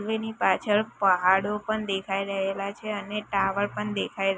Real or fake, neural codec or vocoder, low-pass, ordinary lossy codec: real; none; none; none